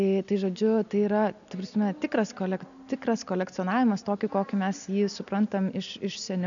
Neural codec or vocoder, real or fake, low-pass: none; real; 7.2 kHz